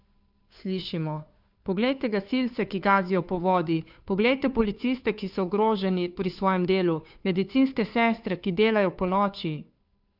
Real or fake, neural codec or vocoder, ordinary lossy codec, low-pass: fake; codec, 16 kHz, 2 kbps, FunCodec, trained on Chinese and English, 25 frames a second; none; 5.4 kHz